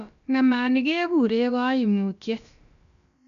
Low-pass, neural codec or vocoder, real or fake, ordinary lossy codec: 7.2 kHz; codec, 16 kHz, about 1 kbps, DyCAST, with the encoder's durations; fake; none